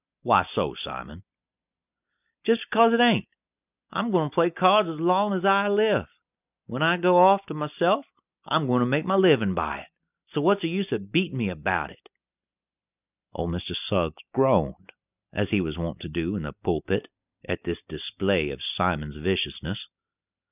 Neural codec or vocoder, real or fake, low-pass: none; real; 3.6 kHz